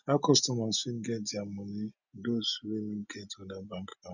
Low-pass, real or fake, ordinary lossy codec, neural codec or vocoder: 7.2 kHz; real; none; none